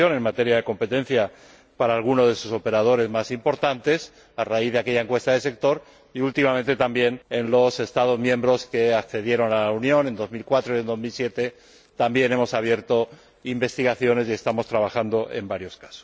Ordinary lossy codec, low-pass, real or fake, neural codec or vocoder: none; none; real; none